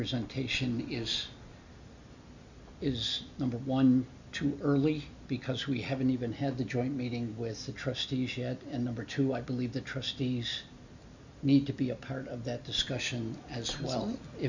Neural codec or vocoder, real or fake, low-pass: none; real; 7.2 kHz